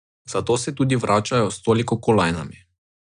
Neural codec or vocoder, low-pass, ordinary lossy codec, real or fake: none; 9.9 kHz; none; real